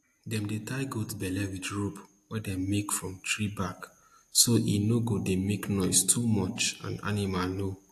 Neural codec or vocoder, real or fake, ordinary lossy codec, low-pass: none; real; AAC, 64 kbps; 14.4 kHz